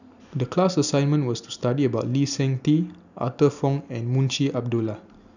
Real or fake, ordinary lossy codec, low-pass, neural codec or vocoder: real; none; 7.2 kHz; none